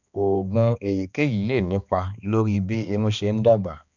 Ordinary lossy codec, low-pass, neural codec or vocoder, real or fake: none; 7.2 kHz; codec, 16 kHz, 2 kbps, X-Codec, HuBERT features, trained on balanced general audio; fake